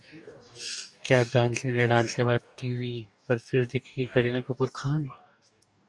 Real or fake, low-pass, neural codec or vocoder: fake; 10.8 kHz; codec, 44.1 kHz, 2.6 kbps, DAC